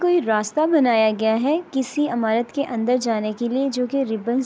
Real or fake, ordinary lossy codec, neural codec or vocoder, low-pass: real; none; none; none